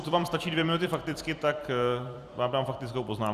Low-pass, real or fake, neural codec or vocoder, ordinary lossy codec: 14.4 kHz; fake; vocoder, 44.1 kHz, 128 mel bands every 256 samples, BigVGAN v2; Opus, 64 kbps